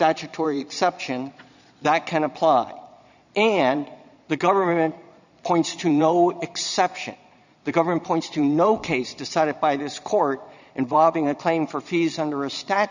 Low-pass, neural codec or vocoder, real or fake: 7.2 kHz; vocoder, 22.05 kHz, 80 mel bands, Vocos; fake